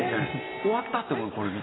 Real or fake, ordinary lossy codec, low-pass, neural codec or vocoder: real; AAC, 16 kbps; 7.2 kHz; none